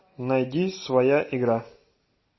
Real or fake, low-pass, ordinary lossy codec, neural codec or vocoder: real; 7.2 kHz; MP3, 24 kbps; none